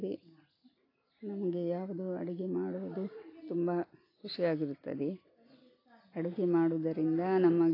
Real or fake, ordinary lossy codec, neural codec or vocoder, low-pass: real; AAC, 24 kbps; none; 5.4 kHz